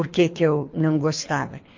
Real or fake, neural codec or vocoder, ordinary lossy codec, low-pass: fake; codec, 24 kHz, 3 kbps, HILCodec; MP3, 48 kbps; 7.2 kHz